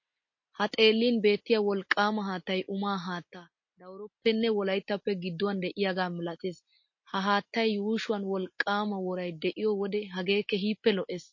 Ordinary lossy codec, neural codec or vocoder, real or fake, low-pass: MP3, 32 kbps; none; real; 7.2 kHz